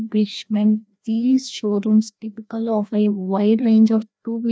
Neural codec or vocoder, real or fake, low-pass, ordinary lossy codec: codec, 16 kHz, 1 kbps, FreqCodec, larger model; fake; none; none